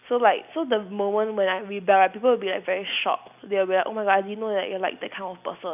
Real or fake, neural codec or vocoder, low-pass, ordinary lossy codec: real; none; 3.6 kHz; none